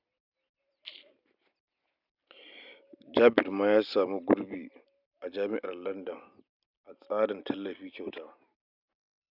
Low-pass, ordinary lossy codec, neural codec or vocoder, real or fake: 5.4 kHz; none; none; real